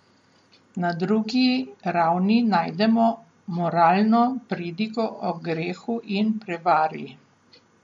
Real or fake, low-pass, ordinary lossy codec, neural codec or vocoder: real; 10.8 kHz; MP3, 48 kbps; none